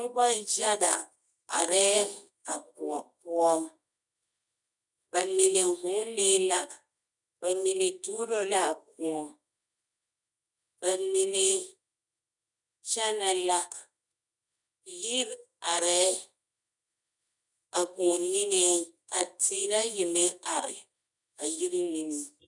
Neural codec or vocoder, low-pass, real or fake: codec, 24 kHz, 0.9 kbps, WavTokenizer, medium music audio release; 10.8 kHz; fake